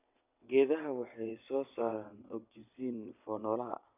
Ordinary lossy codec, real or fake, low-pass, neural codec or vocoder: none; fake; 3.6 kHz; vocoder, 22.05 kHz, 80 mel bands, WaveNeXt